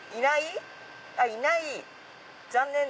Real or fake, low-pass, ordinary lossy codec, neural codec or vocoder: real; none; none; none